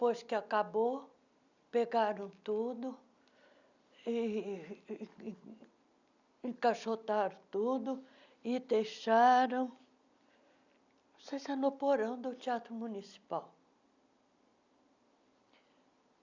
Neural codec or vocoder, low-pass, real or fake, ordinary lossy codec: none; 7.2 kHz; real; none